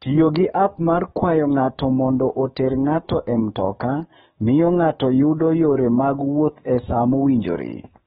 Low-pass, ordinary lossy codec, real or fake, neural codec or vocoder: 19.8 kHz; AAC, 16 kbps; fake; codec, 44.1 kHz, 7.8 kbps, DAC